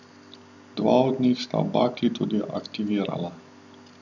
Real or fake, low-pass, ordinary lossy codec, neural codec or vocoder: real; 7.2 kHz; none; none